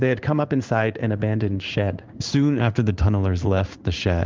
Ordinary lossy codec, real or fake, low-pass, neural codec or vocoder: Opus, 24 kbps; fake; 7.2 kHz; codec, 16 kHz in and 24 kHz out, 1 kbps, XY-Tokenizer